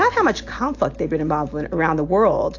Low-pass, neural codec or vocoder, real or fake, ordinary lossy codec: 7.2 kHz; none; real; AAC, 48 kbps